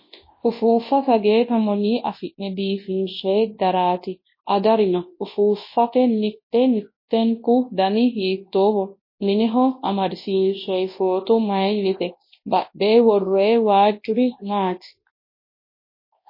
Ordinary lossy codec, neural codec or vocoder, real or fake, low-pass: MP3, 24 kbps; codec, 24 kHz, 0.9 kbps, WavTokenizer, large speech release; fake; 5.4 kHz